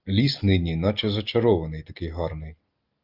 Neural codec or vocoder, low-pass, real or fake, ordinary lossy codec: none; 5.4 kHz; real; Opus, 24 kbps